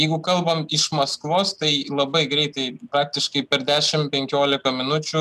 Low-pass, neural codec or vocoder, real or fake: 14.4 kHz; none; real